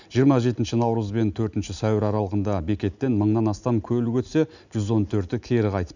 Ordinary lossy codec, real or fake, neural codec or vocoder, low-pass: none; real; none; 7.2 kHz